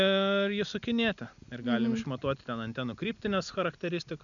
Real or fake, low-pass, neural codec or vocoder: real; 7.2 kHz; none